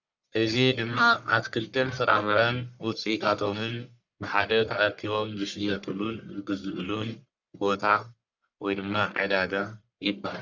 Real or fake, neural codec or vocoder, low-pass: fake; codec, 44.1 kHz, 1.7 kbps, Pupu-Codec; 7.2 kHz